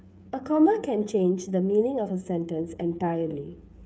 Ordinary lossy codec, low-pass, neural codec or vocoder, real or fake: none; none; codec, 16 kHz, 8 kbps, FreqCodec, smaller model; fake